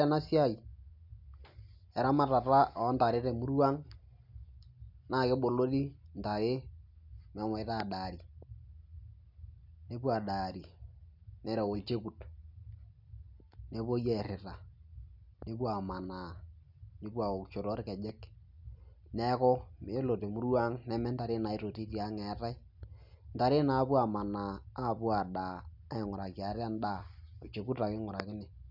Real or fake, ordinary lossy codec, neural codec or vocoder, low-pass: real; none; none; 5.4 kHz